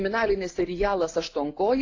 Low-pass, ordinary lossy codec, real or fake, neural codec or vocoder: 7.2 kHz; AAC, 32 kbps; real; none